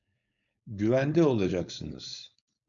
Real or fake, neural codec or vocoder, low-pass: fake; codec, 16 kHz, 4.8 kbps, FACodec; 7.2 kHz